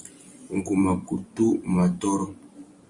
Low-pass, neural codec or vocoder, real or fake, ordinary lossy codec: 10.8 kHz; vocoder, 44.1 kHz, 128 mel bands every 256 samples, BigVGAN v2; fake; Opus, 64 kbps